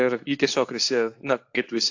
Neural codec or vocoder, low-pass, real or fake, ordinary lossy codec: codec, 24 kHz, 0.9 kbps, WavTokenizer, medium speech release version 2; 7.2 kHz; fake; AAC, 48 kbps